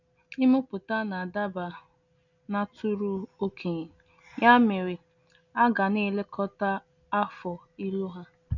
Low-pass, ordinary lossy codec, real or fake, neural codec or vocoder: 7.2 kHz; none; real; none